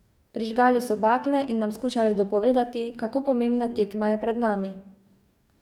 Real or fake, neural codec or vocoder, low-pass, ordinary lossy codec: fake; codec, 44.1 kHz, 2.6 kbps, DAC; 19.8 kHz; none